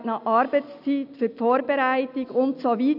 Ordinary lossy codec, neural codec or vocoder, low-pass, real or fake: none; none; 5.4 kHz; real